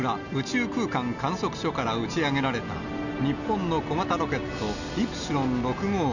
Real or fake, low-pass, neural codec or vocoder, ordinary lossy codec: real; 7.2 kHz; none; none